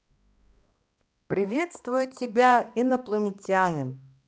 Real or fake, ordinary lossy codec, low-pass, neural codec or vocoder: fake; none; none; codec, 16 kHz, 1 kbps, X-Codec, HuBERT features, trained on balanced general audio